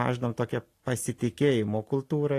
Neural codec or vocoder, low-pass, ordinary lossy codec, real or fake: none; 14.4 kHz; AAC, 48 kbps; real